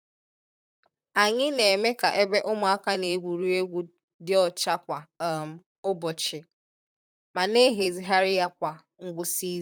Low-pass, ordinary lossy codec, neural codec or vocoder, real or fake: none; none; vocoder, 44.1 kHz, 128 mel bands, Pupu-Vocoder; fake